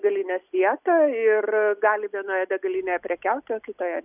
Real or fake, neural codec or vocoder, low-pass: real; none; 3.6 kHz